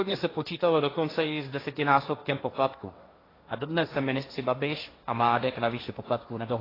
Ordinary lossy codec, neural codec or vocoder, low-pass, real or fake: AAC, 24 kbps; codec, 16 kHz, 1.1 kbps, Voila-Tokenizer; 5.4 kHz; fake